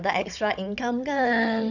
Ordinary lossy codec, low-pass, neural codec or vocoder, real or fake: none; 7.2 kHz; codec, 16 kHz, 16 kbps, FunCodec, trained on Chinese and English, 50 frames a second; fake